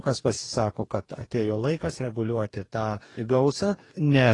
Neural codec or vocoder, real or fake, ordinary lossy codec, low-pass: codec, 44.1 kHz, 2.6 kbps, DAC; fake; AAC, 32 kbps; 10.8 kHz